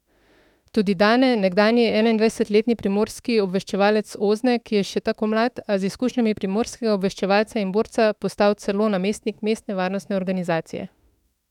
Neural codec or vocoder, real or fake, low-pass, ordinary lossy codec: autoencoder, 48 kHz, 32 numbers a frame, DAC-VAE, trained on Japanese speech; fake; 19.8 kHz; none